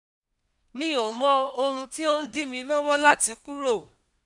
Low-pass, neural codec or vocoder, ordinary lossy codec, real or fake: 10.8 kHz; codec, 24 kHz, 1 kbps, SNAC; none; fake